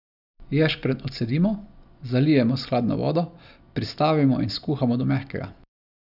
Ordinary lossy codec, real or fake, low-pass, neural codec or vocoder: none; real; 5.4 kHz; none